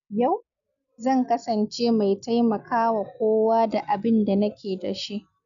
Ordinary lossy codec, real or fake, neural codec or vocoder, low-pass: none; real; none; 7.2 kHz